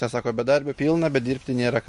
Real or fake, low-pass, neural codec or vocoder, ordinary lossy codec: real; 14.4 kHz; none; MP3, 48 kbps